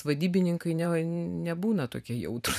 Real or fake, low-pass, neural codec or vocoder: fake; 14.4 kHz; vocoder, 44.1 kHz, 128 mel bands every 512 samples, BigVGAN v2